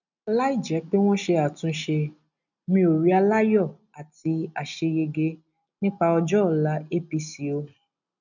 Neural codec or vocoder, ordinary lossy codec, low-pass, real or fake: none; none; 7.2 kHz; real